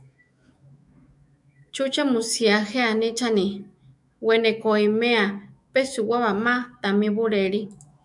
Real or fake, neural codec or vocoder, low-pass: fake; autoencoder, 48 kHz, 128 numbers a frame, DAC-VAE, trained on Japanese speech; 10.8 kHz